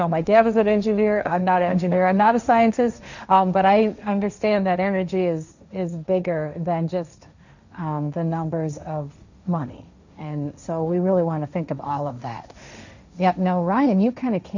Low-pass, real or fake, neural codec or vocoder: 7.2 kHz; fake; codec, 16 kHz, 1.1 kbps, Voila-Tokenizer